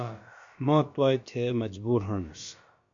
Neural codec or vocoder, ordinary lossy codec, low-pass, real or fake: codec, 16 kHz, about 1 kbps, DyCAST, with the encoder's durations; MP3, 48 kbps; 7.2 kHz; fake